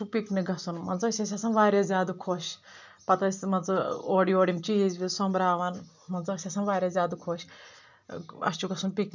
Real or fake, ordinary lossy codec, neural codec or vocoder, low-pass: real; none; none; 7.2 kHz